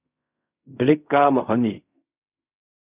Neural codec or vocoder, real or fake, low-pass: codec, 16 kHz in and 24 kHz out, 0.4 kbps, LongCat-Audio-Codec, fine tuned four codebook decoder; fake; 3.6 kHz